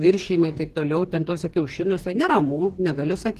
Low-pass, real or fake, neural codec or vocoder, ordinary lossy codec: 14.4 kHz; fake; codec, 44.1 kHz, 2.6 kbps, DAC; Opus, 16 kbps